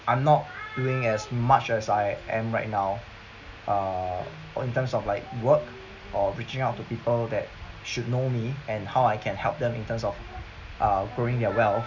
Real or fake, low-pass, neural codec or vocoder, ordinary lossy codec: real; 7.2 kHz; none; none